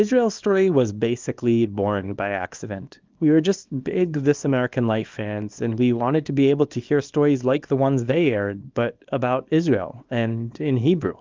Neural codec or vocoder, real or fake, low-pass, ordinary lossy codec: codec, 24 kHz, 0.9 kbps, WavTokenizer, small release; fake; 7.2 kHz; Opus, 24 kbps